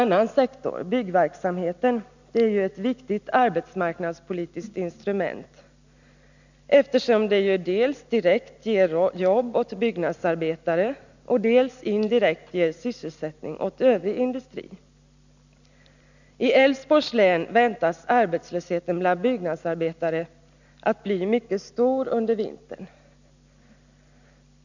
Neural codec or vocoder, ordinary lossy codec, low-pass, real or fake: none; none; 7.2 kHz; real